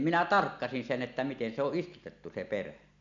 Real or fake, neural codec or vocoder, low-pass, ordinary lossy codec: real; none; 7.2 kHz; none